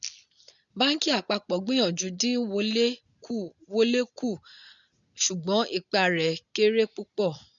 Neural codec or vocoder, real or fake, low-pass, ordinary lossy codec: none; real; 7.2 kHz; none